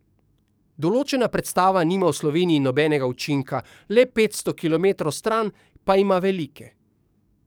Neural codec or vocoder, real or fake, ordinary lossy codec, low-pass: codec, 44.1 kHz, 7.8 kbps, DAC; fake; none; none